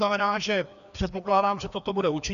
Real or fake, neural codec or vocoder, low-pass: fake; codec, 16 kHz, 2 kbps, FreqCodec, larger model; 7.2 kHz